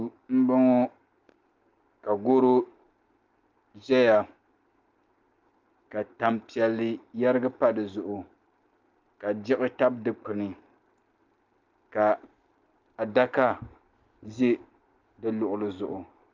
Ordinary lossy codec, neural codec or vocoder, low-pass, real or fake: Opus, 32 kbps; none; 7.2 kHz; real